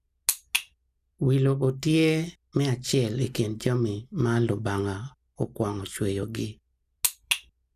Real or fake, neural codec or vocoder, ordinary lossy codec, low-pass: real; none; none; 14.4 kHz